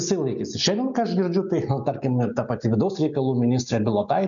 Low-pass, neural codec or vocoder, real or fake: 7.2 kHz; none; real